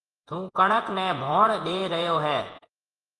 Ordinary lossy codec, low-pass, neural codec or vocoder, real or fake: Opus, 24 kbps; 10.8 kHz; vocoder, 48 kHz, 128 mel bands, Vocos; fake